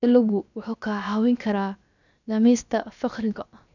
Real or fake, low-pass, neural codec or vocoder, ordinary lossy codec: fake; 7.2 kHz; codec, 16 kHz, 0.7 kbps, FocalCodec; none